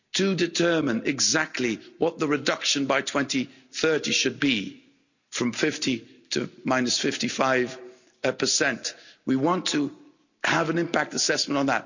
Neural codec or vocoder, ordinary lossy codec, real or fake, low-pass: none; none; real; 7.2 kHz